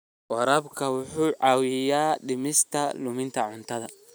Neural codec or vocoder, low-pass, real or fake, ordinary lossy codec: none; none; real; none